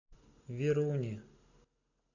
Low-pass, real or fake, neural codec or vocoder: 7.2 kHz; real; none